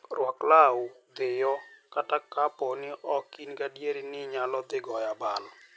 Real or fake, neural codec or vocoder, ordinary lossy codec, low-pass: real; none; none; none